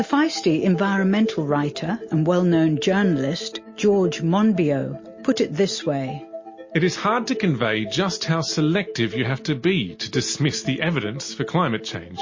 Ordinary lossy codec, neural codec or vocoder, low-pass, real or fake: MP3, 32 kbps; none; 7.2 kHz; real